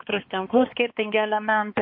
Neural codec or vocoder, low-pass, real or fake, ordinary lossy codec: codec, 16 kHz, 2 kbps, X-Codec, HuBERT features, trained on balanced general audio; 5.4 kHz; fake; MP3, 32 kbps